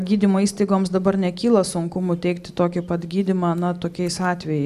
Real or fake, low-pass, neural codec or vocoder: real; 14.4 kHz; none